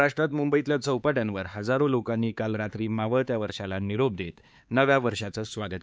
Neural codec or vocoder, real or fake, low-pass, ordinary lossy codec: codec, 16 kHz, 4 kbps, X-Codec, HuBERT features, trained on LibriSpeech; fake; none; none